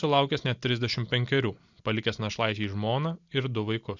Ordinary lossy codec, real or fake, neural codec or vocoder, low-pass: Opus, 64 kbps; real; none; 7.2 kHz